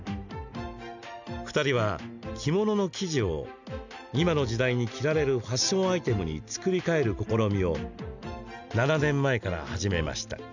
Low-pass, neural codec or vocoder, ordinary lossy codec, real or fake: 7.2 kHz; none; none; real